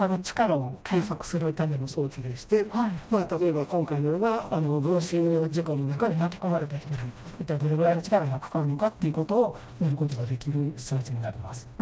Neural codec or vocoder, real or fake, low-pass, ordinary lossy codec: codec, 16 kHz, 1 kbps, FreqCodec, smaller model; fake; none; none